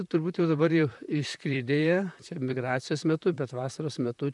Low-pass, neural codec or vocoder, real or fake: 10.8 kHz; vocoder, 44.1 kHz, 128 mel bands, Pupu-Vocoder; fake